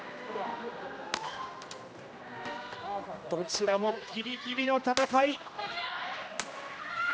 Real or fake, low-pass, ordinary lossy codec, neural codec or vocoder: fake; none; none; codec, 16 kHz, 1 kbps, X-Codec, HuBERT features, trained on general audio